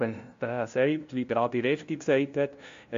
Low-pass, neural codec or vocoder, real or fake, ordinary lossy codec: 7.2 kHz; codec, 16 kHz, 0.5 kbps, FunCodec, trained on LibriTTS, 25 frames a second; fake; MP3, 48 kbps